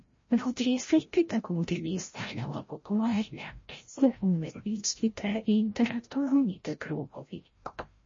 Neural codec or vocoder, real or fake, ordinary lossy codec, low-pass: codec, 16 kHz, 0.5 kbps, FreqCodec, larger model; fake; MP3, 32 kbps; 7.2 kHz